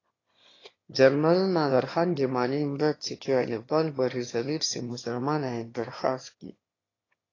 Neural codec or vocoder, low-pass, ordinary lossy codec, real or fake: autoencoder, 22.05 kHz, a latent of 192 numbers a frame, VITS, trained on one speaker; 7.2 kHz; AAC, 32 kbps; fake